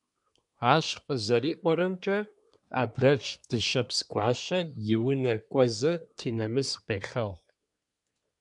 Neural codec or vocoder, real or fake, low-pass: codec, 24 kHz, 1 kbps, SNAC; fake; 10.8 kHz